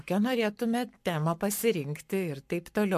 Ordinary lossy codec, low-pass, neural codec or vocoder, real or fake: MP3, 64 kbps; 14.4 kHz; codec, 44.1 kHz, 7.8 kbps, Pupu-Codec; fake